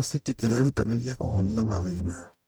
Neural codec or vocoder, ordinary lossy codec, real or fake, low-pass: codec, 44.1 kHz, 0.9 kbps, DAC; none; fake; none